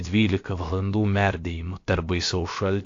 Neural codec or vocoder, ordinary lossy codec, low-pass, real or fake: codec, 16 kHz, about 1 kbps, DyCAST, with the encoder's durations; AAC, 48 kbps; 7.2 kHz; fake